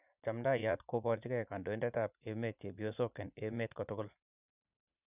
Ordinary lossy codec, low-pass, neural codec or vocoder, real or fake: none; 3.6 kHz; vocoder, 44.1 kHz, 80 mel bands, Vocos; fake